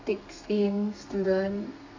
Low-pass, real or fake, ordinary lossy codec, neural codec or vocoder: 7.2 kHz; fake; AAC, 48 kbps; codec, 32 kHz, 1.9 kbps, SNAC